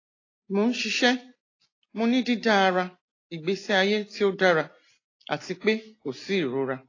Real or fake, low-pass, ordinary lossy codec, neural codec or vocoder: real; 7.2 kHz; AAC, 32 kbps; none